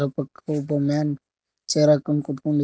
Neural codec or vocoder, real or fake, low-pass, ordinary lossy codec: none; real; none; none